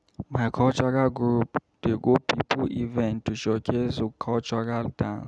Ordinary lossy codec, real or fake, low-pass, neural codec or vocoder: none; real; none; none